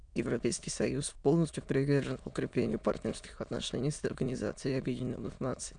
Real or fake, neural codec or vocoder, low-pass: fake; autoencoder, 22.05 kHz, a latent of 192 numbers a frame, VITS, trained on many speakers; 9.9 kHz